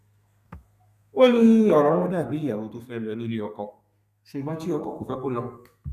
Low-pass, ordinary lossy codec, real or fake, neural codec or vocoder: 14.4 kHz; none; fake; codec, 32 kHz, 1.9 kbps, SNAC